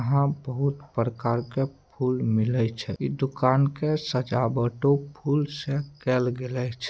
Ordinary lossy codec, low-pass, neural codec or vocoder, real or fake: none; none; none; real